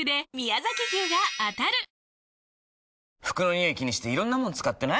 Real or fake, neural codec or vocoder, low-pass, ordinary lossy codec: real; none; none; none